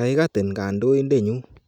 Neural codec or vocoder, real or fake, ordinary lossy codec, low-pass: none; real; none; 19.8 kHz